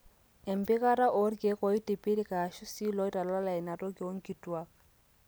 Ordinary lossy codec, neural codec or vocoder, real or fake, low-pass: none; none; real; none